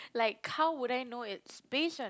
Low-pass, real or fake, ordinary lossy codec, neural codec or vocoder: none; real; none; none